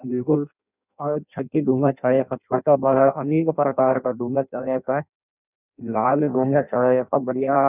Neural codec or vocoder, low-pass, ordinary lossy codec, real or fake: codec, 16 kHz in and 24 kHz out, 0.6 kbps, FireRedTTS-2 codec; 3.6 kHz; none; fake